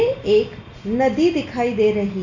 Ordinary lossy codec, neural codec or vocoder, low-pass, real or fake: none; none; 7.2 kHz; real